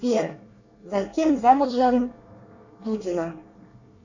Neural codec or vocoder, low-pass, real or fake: codec, 24 kHz, 1 kbps, SNAC; 7.2 kHz; fake